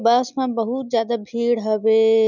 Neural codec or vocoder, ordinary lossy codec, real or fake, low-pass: none; none; real; 7.2 kHz